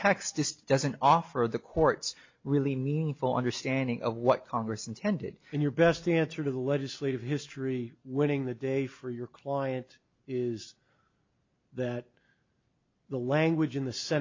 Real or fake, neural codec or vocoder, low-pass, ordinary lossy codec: real; none; 7.2 kHz; MP3, 48 kbps